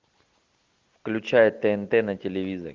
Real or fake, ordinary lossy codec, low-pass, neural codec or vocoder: real; Opus, 24 kbps; 7.2 kHz; none